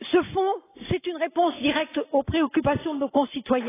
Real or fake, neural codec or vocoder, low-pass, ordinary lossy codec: real; none; 3.6 kHz; AAC, 16 kbps